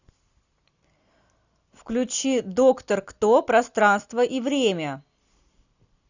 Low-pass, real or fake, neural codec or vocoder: 7.2 kHz; real; none